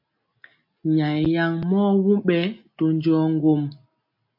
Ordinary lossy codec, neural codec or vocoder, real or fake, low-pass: MP3, 32 kbps; none; real; 5.4 kHz